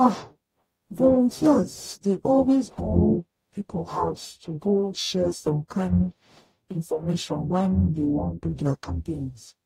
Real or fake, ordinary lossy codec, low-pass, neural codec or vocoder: fake; AAC, 48 kbps; 19.8 kHz; codec, 44.1 kHz, 0.9 kbps, DAC